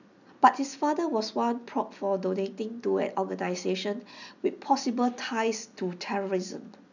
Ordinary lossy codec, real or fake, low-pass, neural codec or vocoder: none; real; 7.2 kHz; none